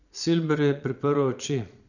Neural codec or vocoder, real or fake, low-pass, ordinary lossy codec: vocoder, 22.05 kHz, 80 mel bands, Vocos; fake; 7.2 kHz; none